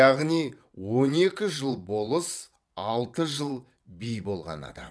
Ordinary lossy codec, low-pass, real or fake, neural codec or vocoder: none; none; fake; vocoder, 22.05 kHz, 80 mel bands, WaveNeXt